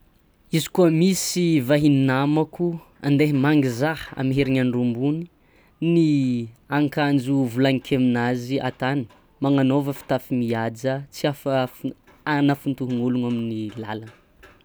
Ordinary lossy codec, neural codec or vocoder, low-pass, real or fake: none; none; none; real